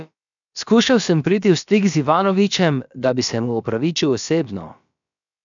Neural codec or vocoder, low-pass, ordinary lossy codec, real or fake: codec, 16 kHz, about 1 kbps, DyCAST, with the encoder's durations; 7.2 kHz; MP3, 96 kbps; fake